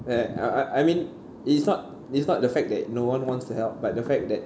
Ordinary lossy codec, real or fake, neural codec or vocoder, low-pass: none; real; none; none